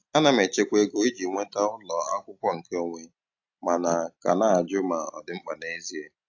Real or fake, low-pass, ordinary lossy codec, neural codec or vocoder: real; 7.2 kHz; AAC, 48 kbps; none